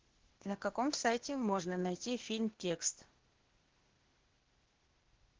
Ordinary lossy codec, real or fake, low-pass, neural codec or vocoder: Opus, 16 kbps; fake; 7.2 kHz; codec, 16 kHz, 0.8 kbps, ZipCodec